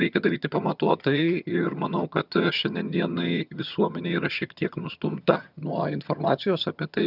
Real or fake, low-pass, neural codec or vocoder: fake; 5.4 kHz; vocoder, 22.05 kHz, 80 mel bands, HiFi-GAN